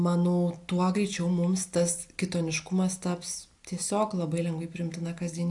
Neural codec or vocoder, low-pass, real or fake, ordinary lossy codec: none; 10.8 kHz; real; AAC, 64 kbps